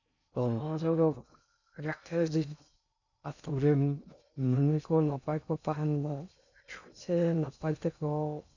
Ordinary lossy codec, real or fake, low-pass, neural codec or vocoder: none; fake; 7.2 kHz; codec, 16 kHz in and 24 kHz out, 0.6 kbps, FocalCodec, streaming, 2048 codes